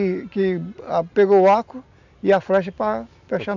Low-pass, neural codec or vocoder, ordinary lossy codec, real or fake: 7.2 kHz; none; none; real